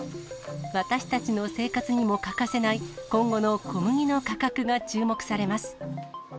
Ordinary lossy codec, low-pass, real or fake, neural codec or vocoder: none; none; real; none